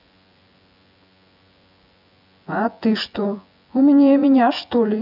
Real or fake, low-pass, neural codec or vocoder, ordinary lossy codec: fake; 5.4 kHz; vocoder, 24 kHz, 100 mel bands, Vocos; AAC, 48 kbps